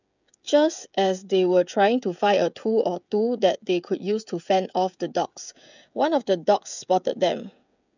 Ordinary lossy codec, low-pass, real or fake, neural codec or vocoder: none; 7.2 kHz; fake; codec, 16 kHz, 16 kbps, FreqCodec, smaller model